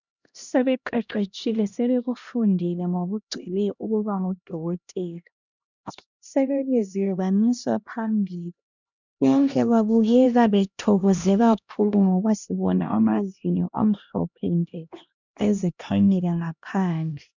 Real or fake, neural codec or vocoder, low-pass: fake; codec, 16 kHz, 1 kbps, X-Codec, HuBERT features, trained on LibriSpeech; 7.2 kHz